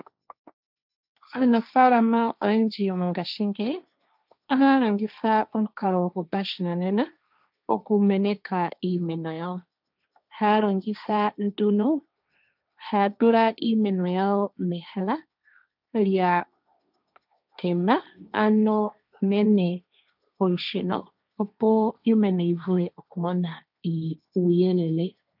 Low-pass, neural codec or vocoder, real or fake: 5.4 kHz; codec, 16 kHz, 1.1 kbps, Voila-Tokenizer; fake